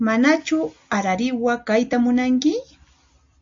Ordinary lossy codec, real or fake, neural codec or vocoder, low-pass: AAC, 64 kbps; real; none; 7.2 kHz